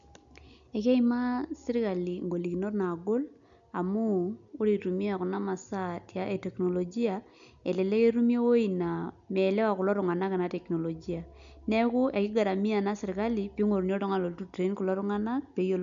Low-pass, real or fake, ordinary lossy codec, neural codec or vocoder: 7.2 kHz; real; none; none